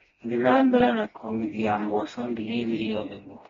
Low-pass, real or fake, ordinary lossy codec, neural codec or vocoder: 7.2 kHz; fake; AAC, 24 kbps; codec, 16 kHz, 1 kbps, FreqCodec, smaller model